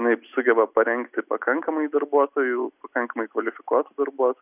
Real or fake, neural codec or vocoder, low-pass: real; none; 3.6 kHz